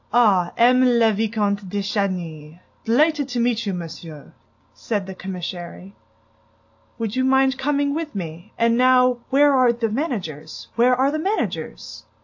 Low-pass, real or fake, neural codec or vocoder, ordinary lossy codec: 7.2 kHz; real; none; AAC, 48 kbps